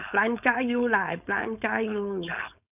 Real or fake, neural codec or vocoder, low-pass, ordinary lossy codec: fake; codec, 16 kHz, 4.8 kbps, FACodec; 3.6 kHz; none